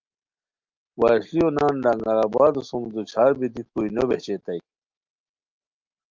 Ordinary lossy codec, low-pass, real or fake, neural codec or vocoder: Opus, 32 kbps; 7.2 kHz; real; none